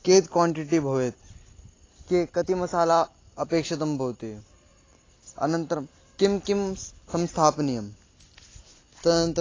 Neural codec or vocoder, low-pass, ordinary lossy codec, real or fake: none; 7.2 kHz; AAC, 32 kbps; real